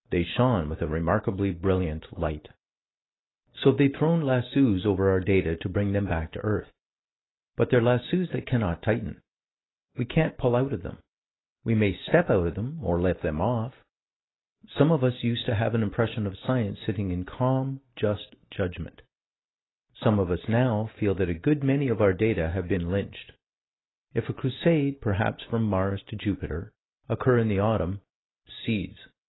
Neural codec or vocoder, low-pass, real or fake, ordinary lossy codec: none; 7.2 kHz; real; AAC, 16 kbps